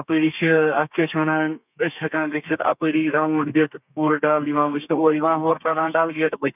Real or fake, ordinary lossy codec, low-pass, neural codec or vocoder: fake; none; 3.6 kHz; codec, 32 kHz, 1.9 kbps, SNAC